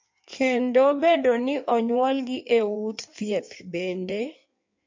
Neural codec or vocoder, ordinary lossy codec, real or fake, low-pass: codec, 16 kHz in and 24 kHz out, 1.1 kbps, FireRedTTS-2 codec; MP3, 48 kbps; fake; 7.2 kHz